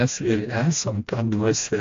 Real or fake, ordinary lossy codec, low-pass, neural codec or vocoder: fake; AAC, 48 kbps; 7.2 kHz; codec, 16 kHz, 1 kbps, FreqCodec, smaller model